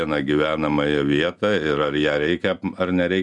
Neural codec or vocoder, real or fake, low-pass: none; real; 10.8 kHz